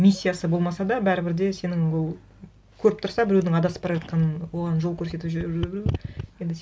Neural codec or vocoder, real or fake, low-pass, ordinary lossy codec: none; real; none; none